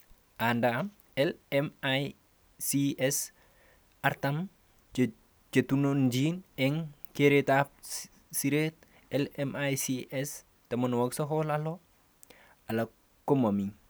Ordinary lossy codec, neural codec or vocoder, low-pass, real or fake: none; none; none; real